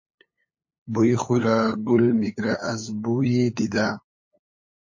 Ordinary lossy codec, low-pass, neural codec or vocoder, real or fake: MP3, 32 kbps; 7.2 kHz; codec, 16 kHz, 8 kbps, FunCodec, trained on LibriTTS, 25 frames a second; fake